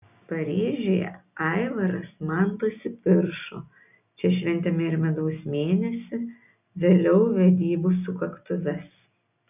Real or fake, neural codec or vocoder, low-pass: real; none; 3.6 kHz